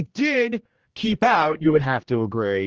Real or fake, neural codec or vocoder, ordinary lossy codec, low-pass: fake; codec, 16 kHz, 1 kbps, X-Codec, HuBERT features, trained on general audio; Opus, 16 kbps; 7.2 kHz